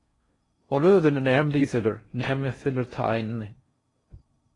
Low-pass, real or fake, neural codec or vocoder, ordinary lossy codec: 10.8 kHz; fake; codec, 16 kHz in and 24 kHz out, 0.6 kbps, FocalCodec, streaming, 4096 codes; AAC, 32 kbps